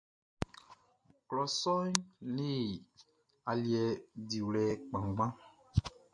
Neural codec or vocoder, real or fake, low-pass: none; real; 9.9 kHz